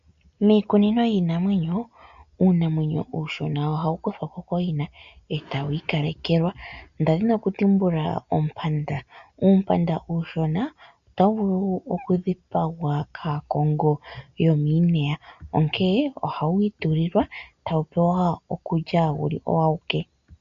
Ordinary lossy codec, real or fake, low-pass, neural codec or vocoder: AAC, 96 kbps; real; 7.2 kHz; none